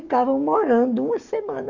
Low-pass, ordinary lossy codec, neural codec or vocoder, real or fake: 7.2 kHz; none; codec, 44.1 kHz, 7.8 kbps, DAC; fake